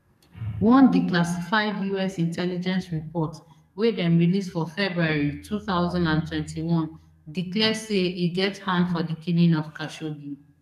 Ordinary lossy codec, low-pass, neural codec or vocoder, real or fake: none; 14.4 kHz; codec, 44.1 kHz, 2.6 kbps, SNAC; fake